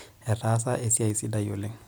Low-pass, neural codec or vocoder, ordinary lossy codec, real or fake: none; none; none; real